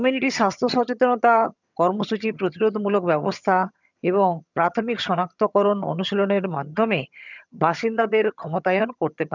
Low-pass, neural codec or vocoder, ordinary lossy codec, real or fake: 7.2 kHz; vocoder, 22.05 kHz, 80 mel bands, HiFi-GAN; none; fake